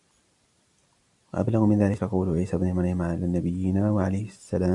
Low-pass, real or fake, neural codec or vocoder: 10.8 kHz; real; none